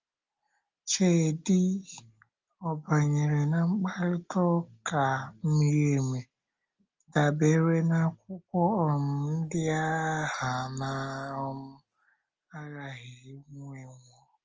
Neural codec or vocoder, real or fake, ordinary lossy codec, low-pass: none; real; Opus, 24 kbps; 7.2 kHz